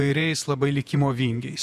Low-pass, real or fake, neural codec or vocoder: 14.4 kHz; fake; vocoder, 48 kHz, 128 mel bands, Vocos